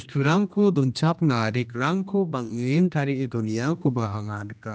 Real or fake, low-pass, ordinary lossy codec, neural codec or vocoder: fake; none; none; codec, 16 kHz, 1 kbps, X-Codec, HuBERT features, trained on general audio